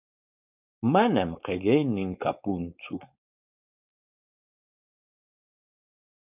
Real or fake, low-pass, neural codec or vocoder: fake; 3.6 kHz; codec, 16 kHz, 4.8 kbps, FACodec